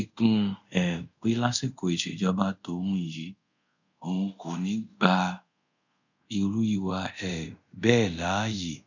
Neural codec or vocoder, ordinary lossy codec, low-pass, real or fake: codec, 24 kHz, 0.5 kbps, DualCodec; none; 7.2 kHz; fake